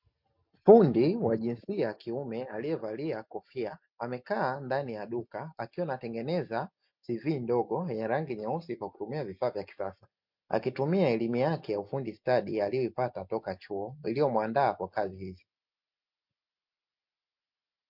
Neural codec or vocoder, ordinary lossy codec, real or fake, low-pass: none; MP3, 48 kbps; real; 5.4 kHz